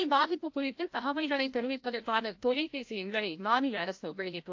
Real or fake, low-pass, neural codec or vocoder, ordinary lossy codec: fake; 7.2 kHz; codec, 16 kHz, 0.5 kbps, FreqCodec, larger model; AAC, 48 kbps